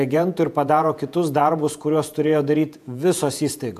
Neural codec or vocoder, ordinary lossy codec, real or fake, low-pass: vocoder, 48 kHz, 128 mel bands, Vocos; AAC, 96 kbps; fake; 14.4 kHz